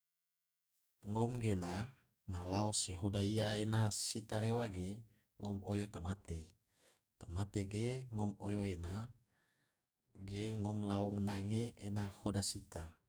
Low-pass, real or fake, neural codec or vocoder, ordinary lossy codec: none; fake; codec, 44.1 kHz, 2.6 kbps, DAC; none